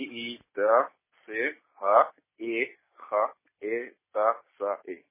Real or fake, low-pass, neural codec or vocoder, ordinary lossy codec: real; 3.6 kHz; none; MP3, 16 kbps